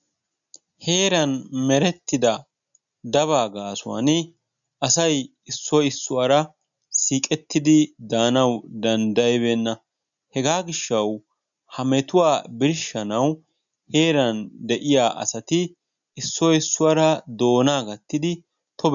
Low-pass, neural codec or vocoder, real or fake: 7.2 kHz; none; real